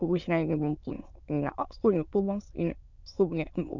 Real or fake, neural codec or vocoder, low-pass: fake; autoencoder, 22.05 kHz, a latent of 192 numbers a frame, VITS, trained on many speakers; 7.2 kHz